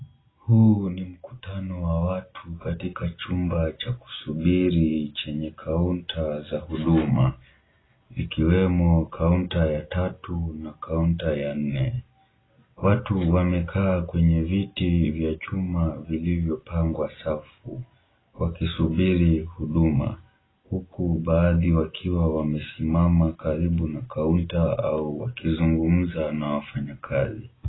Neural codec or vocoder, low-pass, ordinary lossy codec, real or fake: none; 7.2 kHz; AAC, 16 kbps; real